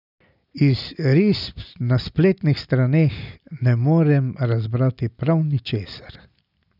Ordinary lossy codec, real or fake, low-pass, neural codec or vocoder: none; real; 5.4 kHz; none